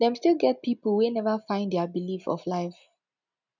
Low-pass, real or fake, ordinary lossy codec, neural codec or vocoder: 7.2 kHz; real; none; none